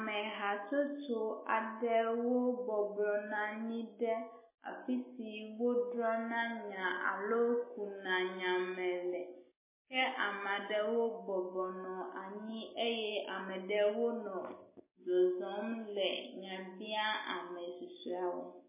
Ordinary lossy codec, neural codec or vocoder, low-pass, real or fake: MP3, 16 kbps; none; 3.6 kHz; real